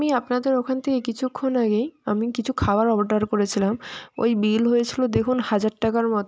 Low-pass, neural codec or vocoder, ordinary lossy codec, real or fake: none; none; none; real